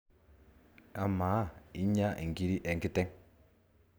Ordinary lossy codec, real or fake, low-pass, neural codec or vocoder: none; real; none; none